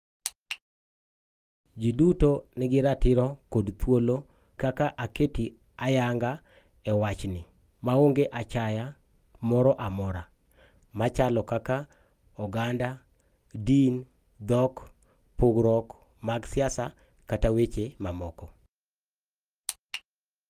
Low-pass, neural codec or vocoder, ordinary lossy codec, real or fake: 14.4 kHz; none; Opus, 32 kbps; real